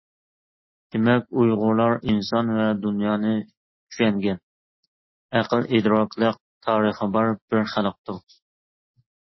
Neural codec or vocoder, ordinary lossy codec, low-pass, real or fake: none; MP3, 24 kbps; 7.2 kHz; real